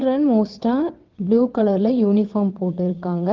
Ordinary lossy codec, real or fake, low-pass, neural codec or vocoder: Opus, 16 kbps; fake; 7.2 kHz; vocoder, 22.05 kHz, 80 mel bands, WaveNeXt